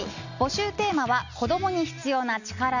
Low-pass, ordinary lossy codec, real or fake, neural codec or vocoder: 7.2 kHz; none; real; none